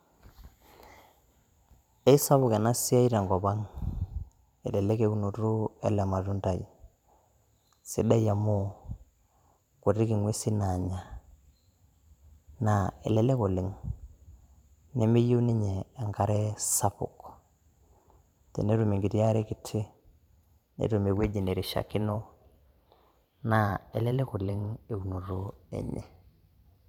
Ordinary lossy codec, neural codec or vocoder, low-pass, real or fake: none; vocoder, 44.1 kHz, 128 mel bands every 512 samples, BigVGAN v2; 19.8 kHz; fake